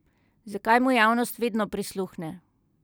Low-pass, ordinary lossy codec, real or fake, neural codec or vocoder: none; none; real; none